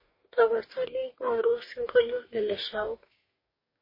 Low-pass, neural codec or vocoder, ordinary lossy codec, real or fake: 5.4 kHz; codec, 44.1 kHz, 2.6 kbps, DAC; MP3, 24 kbps; fake